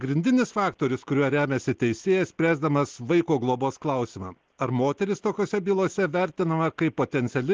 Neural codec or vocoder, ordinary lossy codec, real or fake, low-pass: none; Opus, 16 kbps; real; 7.2 kHz